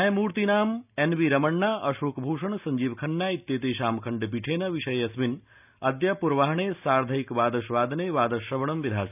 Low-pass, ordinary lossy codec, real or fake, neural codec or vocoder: 3.6 kHz; none; real; none